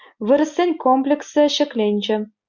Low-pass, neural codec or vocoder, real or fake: 7.2 kHz; none; real